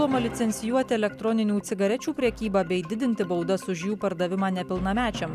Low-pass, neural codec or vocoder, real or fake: 14.4 kHz; none; real